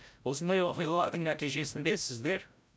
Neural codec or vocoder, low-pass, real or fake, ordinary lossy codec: codec, 16 kHz, 0.5 kbps, FreqCodec, larger model; none; fake; none